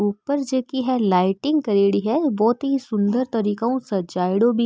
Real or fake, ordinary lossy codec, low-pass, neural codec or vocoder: real; none; none; none